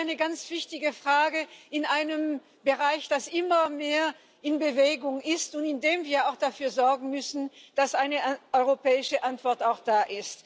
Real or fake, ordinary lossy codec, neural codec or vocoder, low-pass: real; none; none; none